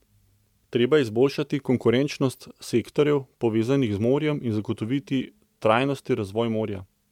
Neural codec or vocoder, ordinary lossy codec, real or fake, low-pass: none; MP3, 96 kbps; real; 19.8 kHz